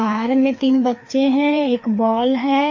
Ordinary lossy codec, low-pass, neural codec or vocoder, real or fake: MP3, 32 kbps; 7.2 kHz; codec, 16 kHz, 2 kbps, FreqCodec, larger model; fake